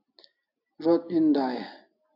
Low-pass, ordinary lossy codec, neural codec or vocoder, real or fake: 5.4 kHz; AAC, 48 kbps; none; real